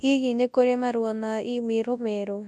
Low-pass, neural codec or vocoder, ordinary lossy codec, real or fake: none; codec, 24 kHz, 0.9 kbps, WavTokenizer, large speech release; none; fake